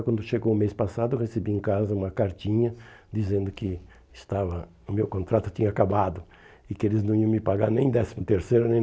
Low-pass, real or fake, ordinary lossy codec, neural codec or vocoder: none; real; none; none